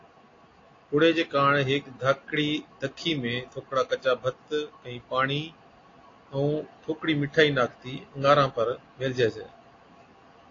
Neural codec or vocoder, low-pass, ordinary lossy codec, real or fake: none; 7.2 kHz; AAC, 32 kbps; real